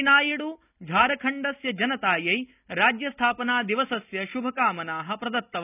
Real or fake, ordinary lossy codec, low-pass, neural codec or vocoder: real; none; 3.6 kHz; none